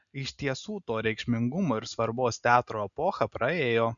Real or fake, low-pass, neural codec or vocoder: real; 7.2 kHz; none